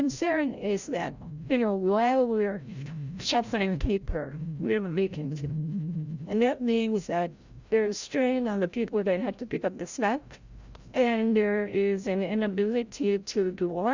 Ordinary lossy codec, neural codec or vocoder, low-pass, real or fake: Opus, 64 kbps; codec, 16 kHz, 0.5 kbps, FreqCodec, larger model; 7.2 kHz; fake